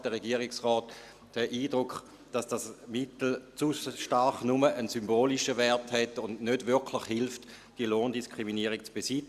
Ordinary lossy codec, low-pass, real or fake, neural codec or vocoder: Opus, 64 kbps; 14.4 kHz; real; none